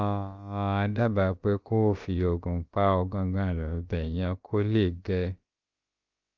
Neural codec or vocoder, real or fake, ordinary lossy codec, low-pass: codec, 16 kHz, about 1 kbps, DyCAST, with the encoder's durations; fake; Opus, 32 kbps; 7.2 kHz